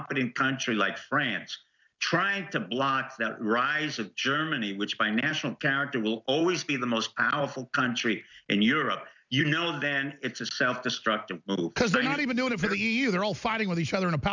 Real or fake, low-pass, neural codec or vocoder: real; 7.2 kHz; none